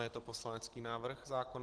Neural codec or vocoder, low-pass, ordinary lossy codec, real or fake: none; 10.8 kHz; Opus, 16 kbps; real